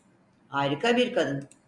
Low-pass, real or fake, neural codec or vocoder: 10.8 kHz; real; none